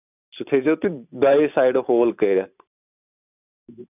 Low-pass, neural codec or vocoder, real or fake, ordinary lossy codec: 3.6 kHz; vocoder, 44.1 kHz, 128 mel bands every 512 samples, BigVGAN v2; fake; none